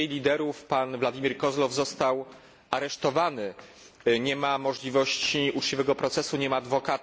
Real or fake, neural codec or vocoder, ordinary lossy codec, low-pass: real; none; none; none